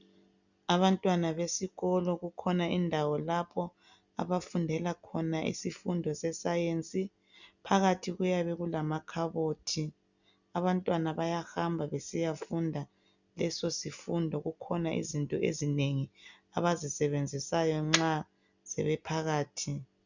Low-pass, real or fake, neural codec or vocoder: 7.2 kHz; real; none